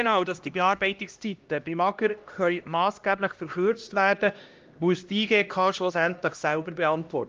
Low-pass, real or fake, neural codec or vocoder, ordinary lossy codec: 7.2 kHz; fake; codec, 16 kHz, 1 kbps, X-Codec, HuBERT features, trained on LibriSpeech; Opus, 32 kbps